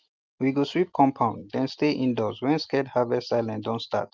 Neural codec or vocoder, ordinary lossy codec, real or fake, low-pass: none; Opus, 24 kbps; real; 7.2 kHz